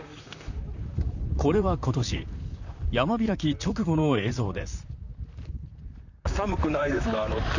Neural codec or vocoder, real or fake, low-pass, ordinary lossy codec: vocoder, 44.1 kHz, 128 mel bands, Pupu-Vocoder; fake; 7.2 kHz; none